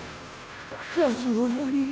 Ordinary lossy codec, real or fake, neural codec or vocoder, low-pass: none; fake; codec, 16 kHz, 0.5 kbps, FunCodec, trained on Chinese and English, 25 frames a second; none